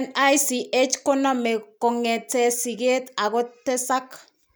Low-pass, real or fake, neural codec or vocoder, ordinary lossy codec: none; real; none; none